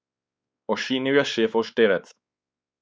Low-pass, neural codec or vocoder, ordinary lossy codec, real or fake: none; codec, 16 kHz, 4 kbps, X-Codec, WavLM features, trained on Multilingual LibriSpeech; none; fake